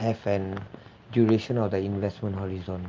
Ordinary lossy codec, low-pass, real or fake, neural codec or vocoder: Opus, 32 kbps; 7.2 kHz; real; none